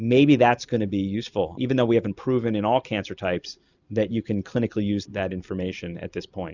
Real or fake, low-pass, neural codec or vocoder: real; 7.2 kHz; none